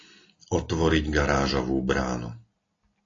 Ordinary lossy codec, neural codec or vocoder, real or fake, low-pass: AAC, 32 kbps; none; real; 7.2 kHz